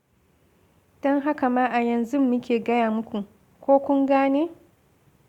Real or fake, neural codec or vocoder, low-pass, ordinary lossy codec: real; none; 19.8 kHz; Opus, 64 kbps